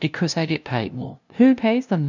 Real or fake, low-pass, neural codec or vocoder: fake; 7.2 kHz; codec, 16 kHz, 0.5 kbps, FunCodec, trained on LibriTTS, 25 frames a second